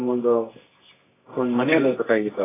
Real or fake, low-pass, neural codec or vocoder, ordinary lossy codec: fake; 3.6 kHz; codec, 24 kHz, 0.9 kbps, WavTokenizer, medium music audio release; AAC, 16 kbps